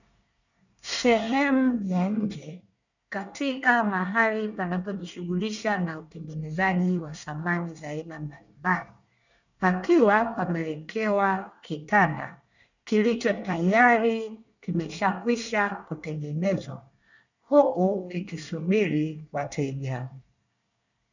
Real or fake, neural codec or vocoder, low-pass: fake; codec, 24 kHz, 1 kbps, SNAC; 7.2 kHz